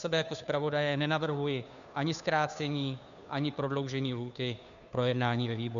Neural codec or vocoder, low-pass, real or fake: codec, 16 kHz, 2 kbps, FunCodec, trained on Chinese and English, 25 frames a second; 7.2 kHz; fake